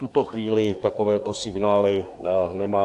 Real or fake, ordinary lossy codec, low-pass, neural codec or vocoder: fake; AAC, 64 kbps; 10.8 kHz; codec, 24 kHz, 1 kbps, SNAC